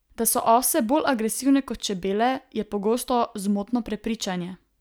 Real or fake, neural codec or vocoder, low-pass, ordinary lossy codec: real; none; none; none